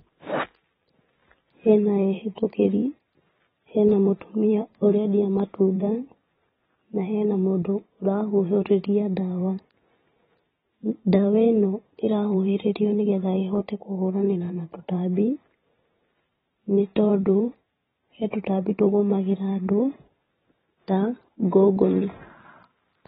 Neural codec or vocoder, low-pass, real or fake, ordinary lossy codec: vocoder, 44.1 kHz, 128 mel bands every 512 samples, BigVGAN v2; 19.8 kHz; fake; AAC, 16 kbps